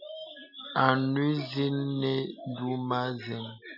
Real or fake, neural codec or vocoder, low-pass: real; none; 5.4 kHz